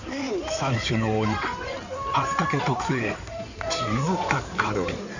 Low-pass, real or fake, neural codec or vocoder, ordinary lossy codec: 7.2 kHz; fake; codec, 16 kHz in and 24 kHz out, 2.2 kbps, FireRedTTS-2 codec; none